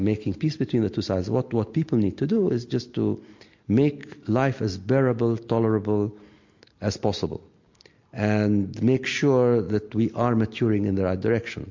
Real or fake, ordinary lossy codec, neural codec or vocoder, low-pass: real; MP3, 48 kbps; none; 7.2 kHz